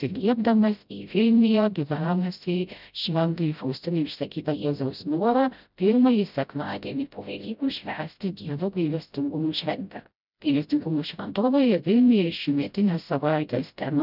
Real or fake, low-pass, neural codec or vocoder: fake; 5.4 kHz; codec, 16 kHz, 0.5 kbps, FreqCodec, smaller model